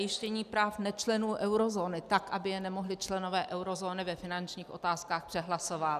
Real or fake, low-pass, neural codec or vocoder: real; 14.4 kHz; none